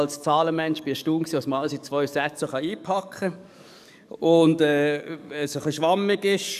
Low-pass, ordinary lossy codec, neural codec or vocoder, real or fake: 14.4 kHz; none; codec, 44.1 kHz, 7.8 kbps, DAC; fake